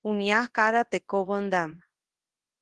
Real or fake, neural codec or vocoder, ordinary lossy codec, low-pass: fake; codec, 24 kHz, 0.9 kbps, WavTokenizer, large speech release; Opus, 16 kbps; 10.8 kHz